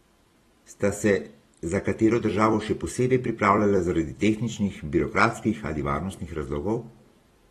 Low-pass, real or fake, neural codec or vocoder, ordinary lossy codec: 19.8 kHz; fake; vocoder, 44.1 kHz, 128 mel bands every 256 samples, BigVGAN v2; AAC, 32 kbps